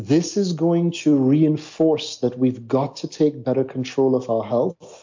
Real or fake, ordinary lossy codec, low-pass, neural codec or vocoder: real; MP3, 48 kbps; 7.2 kHz; none